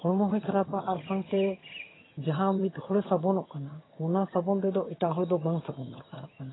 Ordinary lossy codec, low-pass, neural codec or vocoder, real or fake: AAC, 16 kbps; 7.2 kHz; vocoder, 22.05 kHz, 80 mel bands, HiFi-GAN; fake